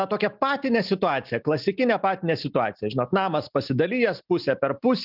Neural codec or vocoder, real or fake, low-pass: none; real; 5.4 kHz